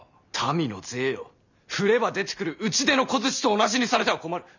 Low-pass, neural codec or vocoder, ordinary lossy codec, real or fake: 7.2 kHz; none; none; real